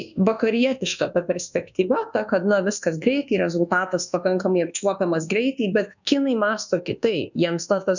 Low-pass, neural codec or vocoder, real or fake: 7.2 kHz; codec, 24 kHz, 1.2 kbps, DualCodec; fake